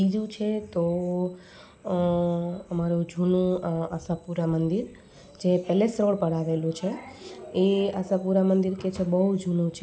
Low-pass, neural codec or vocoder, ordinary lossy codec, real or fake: none; none; none; real